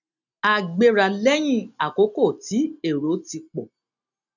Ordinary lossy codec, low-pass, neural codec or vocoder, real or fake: none; 7.2 kHz; none; real